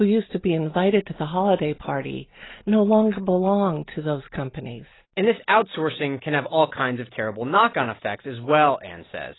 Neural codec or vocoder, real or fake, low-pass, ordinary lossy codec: none; real; 7.2 kHz; AAC, 16 kbps